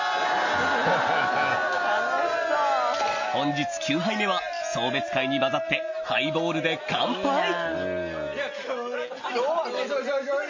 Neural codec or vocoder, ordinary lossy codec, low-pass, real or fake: none; MP3, 32 kbps; 7.2 kHz; real